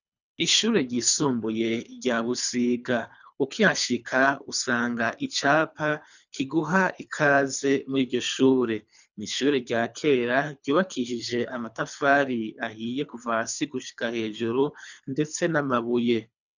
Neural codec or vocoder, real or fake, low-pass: codec, 24 kHz, 3 kbps, HILCodec; fake; 7.2 kHz